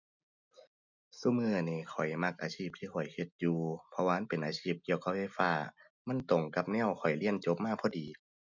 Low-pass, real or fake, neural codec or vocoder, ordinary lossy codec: 7.2 kHz; real; none; none